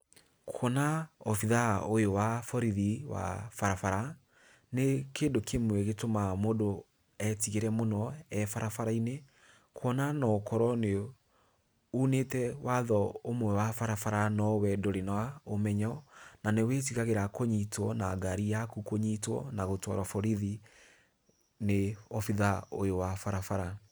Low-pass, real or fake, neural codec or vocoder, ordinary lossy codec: none; real; none; none